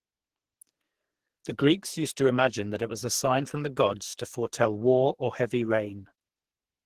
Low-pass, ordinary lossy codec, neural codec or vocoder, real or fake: 14.4 kHz; Opus, 16 kbps; codec, 44.1 kHz, 2.6 kbps, SNAC; fake